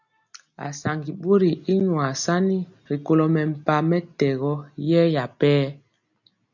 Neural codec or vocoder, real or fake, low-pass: none; real; 7.2 kHz